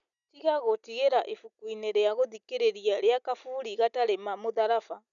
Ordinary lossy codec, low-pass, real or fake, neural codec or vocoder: none; 7.2 kHz; real; none